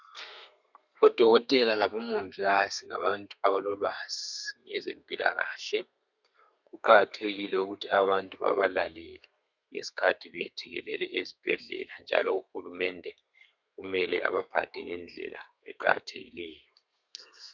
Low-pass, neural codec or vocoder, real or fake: 7.2 kHz; codec, 32 kHz, 1.9 kbps, SNAC; fake